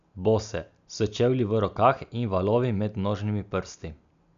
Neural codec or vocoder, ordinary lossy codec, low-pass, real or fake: none; MP3, 96 kbps; 7.2 kHz; real